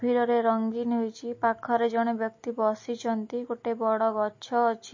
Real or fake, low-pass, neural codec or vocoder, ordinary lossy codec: real; 7.2 kHz; none; MP3, 32 kbps